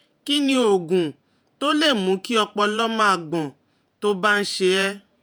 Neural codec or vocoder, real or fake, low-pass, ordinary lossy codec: vocoder, 48 kHz, 128 mel bands, Vocos; fake; none; none